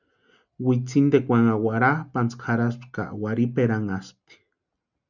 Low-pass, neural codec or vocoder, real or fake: 7.2 kHz; none; real